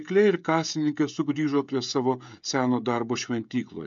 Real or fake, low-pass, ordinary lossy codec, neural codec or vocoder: fake; 7.2 kHz; MP3, 64 kbps; codec, 16 kHz, 16 kbps, FreqCodec, smaller model